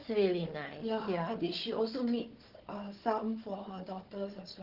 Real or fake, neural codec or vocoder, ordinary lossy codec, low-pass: fake; codec, 16 kHz, 4 kbps, FunCodec, trained on Chinese and English, 50 frames a second; Opus, 16 kbps; 5.4 kHz